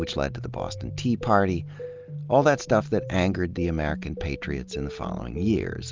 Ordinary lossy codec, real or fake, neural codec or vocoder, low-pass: Opus, 24 kbps; real; none; 7.2 kHz